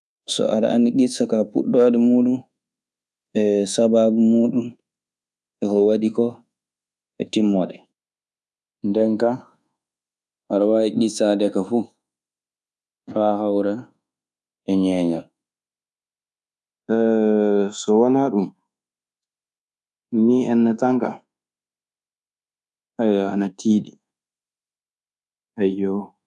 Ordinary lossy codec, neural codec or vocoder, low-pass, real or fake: none; codec, 24 kHz, 1.2 kbps, DualCodec; none; fake